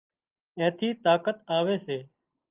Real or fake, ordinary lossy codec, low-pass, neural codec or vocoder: real; Opus, 24 kbps; 3.6 kHz; none